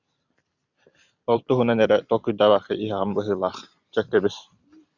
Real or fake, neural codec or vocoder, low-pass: fake; vocoder, 44.1 kHz, 128 mel bands every 256 samples, BigVGAN v2; 7.2 kHz